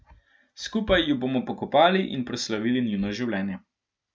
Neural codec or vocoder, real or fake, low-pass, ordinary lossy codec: none; real; none; none